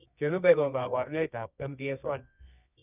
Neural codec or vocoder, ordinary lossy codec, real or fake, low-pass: codec, 24 kHz, 0.9 kbps, WavTokenizer, medium music audio release; none; fake; 3.6 kHz